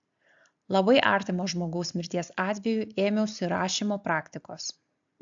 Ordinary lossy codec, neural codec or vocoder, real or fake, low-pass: AAC, 64 kbps; none; real; 7.2 kHz